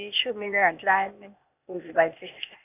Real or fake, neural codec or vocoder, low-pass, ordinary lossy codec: fake; codec, 16 kHz, 0.8 kbps, ZipCodec; 3.6 kHz; none